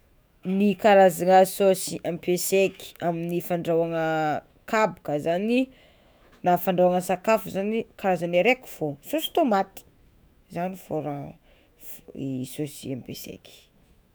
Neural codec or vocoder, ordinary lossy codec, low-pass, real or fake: autoencoder, 48 kHz, 128 numbers a frame, DAC-VAE, trained on Japanese speech; none; none; fake